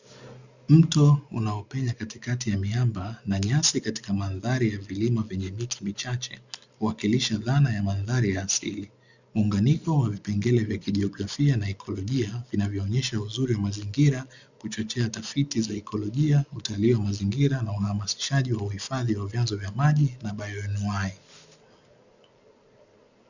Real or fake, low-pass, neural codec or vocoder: real; 7.2 kHz; none